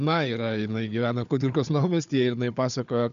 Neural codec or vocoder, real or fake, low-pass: codec, 16 kHz, 4 kbps, FunCodec, trained on Chinese and English, 50 frames a second; fake; 7.2 kHz